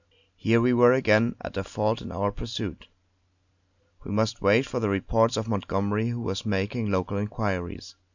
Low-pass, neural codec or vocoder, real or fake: 7.2 kHz; none; real